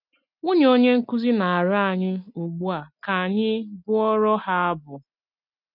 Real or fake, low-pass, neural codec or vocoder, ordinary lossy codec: real; 5.4 kHz; none; none